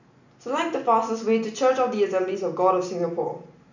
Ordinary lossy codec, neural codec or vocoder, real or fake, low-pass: none; none; real; 7.2 kHz